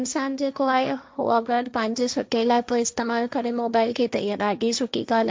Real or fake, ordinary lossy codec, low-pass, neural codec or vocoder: fake; none; none; codec, 16 kHz, 1.1 kbps, Voila-Tokenizer